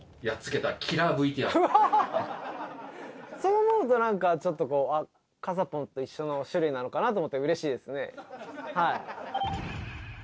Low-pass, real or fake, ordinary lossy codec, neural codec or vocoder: none; real; none; none